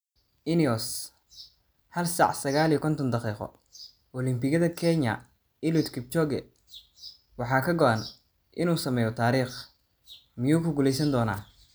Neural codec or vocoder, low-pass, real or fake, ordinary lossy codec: none; none; real; none